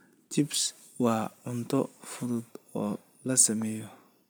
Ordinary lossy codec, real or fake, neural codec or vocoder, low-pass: none; fake; vocoder, 44.1 kHz, 128 mel bands every 256 samples, BigVGAN v2; none